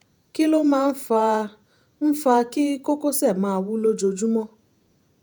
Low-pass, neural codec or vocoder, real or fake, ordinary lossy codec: none; vocoder, 48 kHz, 128 mel bands, Vocos; fake; none